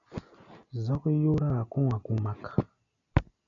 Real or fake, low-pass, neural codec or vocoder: real; 7.2 kHz; none